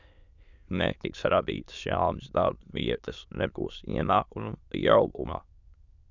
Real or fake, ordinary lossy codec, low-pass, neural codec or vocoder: fake; Opus, 64 kbps; 7.2 kHz; autoencoder, 22.05 kHz, a latent of 192 numbers a frame, VITS, trained on many speakers